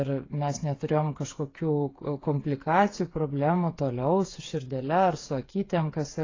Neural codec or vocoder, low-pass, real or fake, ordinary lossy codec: codec, 16 kHz, 8 kbps, FreqCodec, smaller model; 7.2 kHz; fake; AAC, 32 kbps